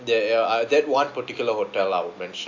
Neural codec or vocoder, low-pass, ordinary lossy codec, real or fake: none; 7.2 kHz; AAC, 48 kbps; real